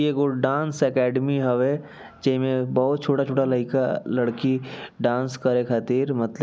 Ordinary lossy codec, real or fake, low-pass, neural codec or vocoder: none; real; none; none